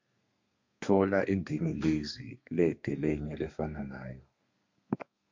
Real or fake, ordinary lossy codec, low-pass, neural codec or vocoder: fake; MP3, 64 kbps; 7.2 kHz; codec, 32 kHz, 1.9 kbps, SNAC